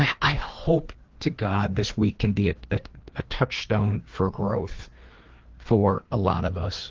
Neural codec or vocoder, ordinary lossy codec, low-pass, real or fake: codec, 16 kHz, 2 kbps, FreqCodec, larger model; Opus, 16 kbps; 7.2 kHz; fake